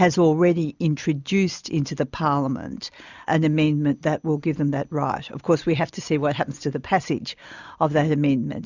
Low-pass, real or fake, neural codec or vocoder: 7.2 kHz; real; none